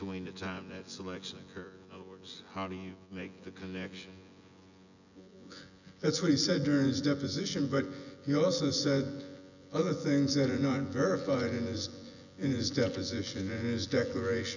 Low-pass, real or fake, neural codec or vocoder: 7.2 kHz; fake; vocoder, 24 kHz, 100 mel bands, Vocos